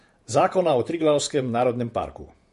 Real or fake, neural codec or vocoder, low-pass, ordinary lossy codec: fake; vocoder, 48 kHz, 128 mel bands, Vocos; 14.4 kHz; MP3, 48 kbps